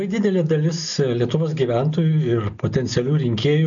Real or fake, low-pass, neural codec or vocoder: real; 7.2 kHz; none